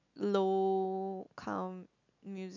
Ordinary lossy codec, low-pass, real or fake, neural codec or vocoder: none; 7.2 kHz; real; none